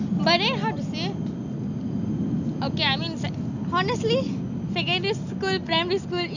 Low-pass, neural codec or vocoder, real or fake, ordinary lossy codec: 7.2 kHz; none; real; none